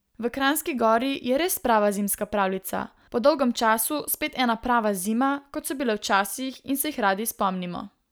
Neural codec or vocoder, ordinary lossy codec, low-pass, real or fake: none; none; none; real